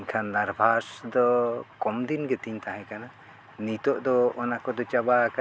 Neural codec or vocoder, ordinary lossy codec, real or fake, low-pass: none; none; real; none